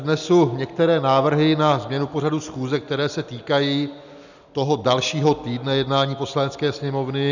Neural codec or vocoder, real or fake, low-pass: none; real; 7.2 kHz